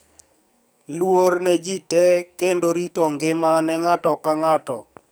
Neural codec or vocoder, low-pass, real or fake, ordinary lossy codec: codec, 44.1 kHz, 2.6 kbps, SNAC; none; fake; none